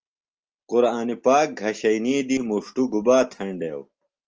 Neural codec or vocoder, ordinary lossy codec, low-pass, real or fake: none; Opus, 24 kbps; 7.2 kHz; real